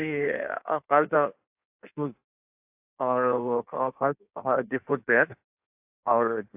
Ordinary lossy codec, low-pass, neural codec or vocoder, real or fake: AAC, 32 kbps; 3.6 kHz; codec, 16 kHz in and 24 kHz out, 1.1 kbps, FireRedTTS-2 codec; fake